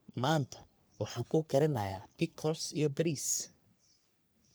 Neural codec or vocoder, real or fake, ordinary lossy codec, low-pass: codec, 44.1 kHz, 3.4 kbps, Pupu-Codec; fake; none; none